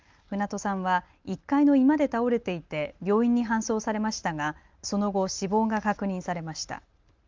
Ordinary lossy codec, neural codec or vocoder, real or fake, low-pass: Opus, 32 kbps; none; real; 7.2 kHz